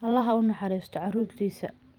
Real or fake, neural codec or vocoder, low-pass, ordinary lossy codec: fake; vocoder, 44.1 kHz, 128 mel bands every 512 samples, BigVGAN v2; 19.8 kHz; Opus, 32 kbps